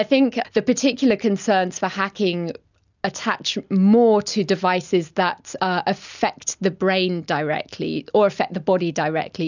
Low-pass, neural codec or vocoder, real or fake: 7.2 kHz; none; real